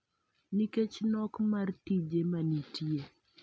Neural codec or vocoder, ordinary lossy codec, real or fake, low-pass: none; none; real; none